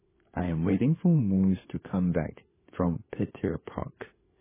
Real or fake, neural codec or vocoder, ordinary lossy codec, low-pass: fake; codec, 16 kHz, 4 kbps, FunCodec, trained on LibriTTS, 50 frames a second; MP3, 16 kbps; 3.6 kHz